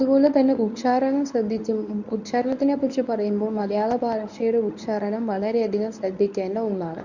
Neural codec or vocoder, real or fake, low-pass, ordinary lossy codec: codec, 24 kHz, 0.9 kbps, WavTokenizer, medium speech release version 1; fake; 7.2 kHz; none